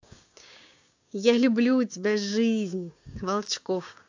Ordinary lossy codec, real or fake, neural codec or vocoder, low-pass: AAC, 48 kbps; fake; codec, 16 kHz, 6 kbps, DAC; 7.2 kHz